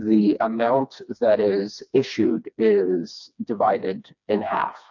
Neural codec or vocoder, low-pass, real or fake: codec, 16 kHz, 2 kbps, FreqCodec, smaller model; 7.2 kHz; fake